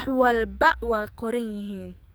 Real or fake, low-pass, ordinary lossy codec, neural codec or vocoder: fake; none; none; codec, 44.1 kHz, 2.6 kbps, SNAC